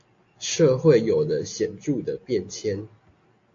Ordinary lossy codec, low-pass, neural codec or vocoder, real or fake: AAC, 48 kbps; 7.2 kHz; none; real